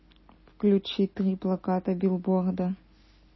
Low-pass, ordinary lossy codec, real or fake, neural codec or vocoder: 7.2 kHz; MP3, 24 kbps; real; none